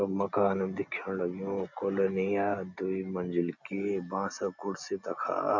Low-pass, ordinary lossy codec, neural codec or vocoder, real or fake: 7.2 kHz; none; none; real